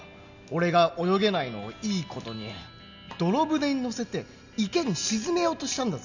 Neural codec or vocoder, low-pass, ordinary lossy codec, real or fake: none; 7.2 kHz; none; real